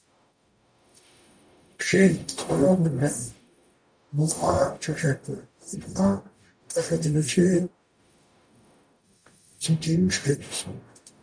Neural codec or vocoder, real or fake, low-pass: codec, 44.1 kHz, 0.9 kbps, DAC; fake; 9.9 kHz